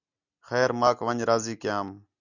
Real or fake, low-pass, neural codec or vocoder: real; 7.2 kHz; none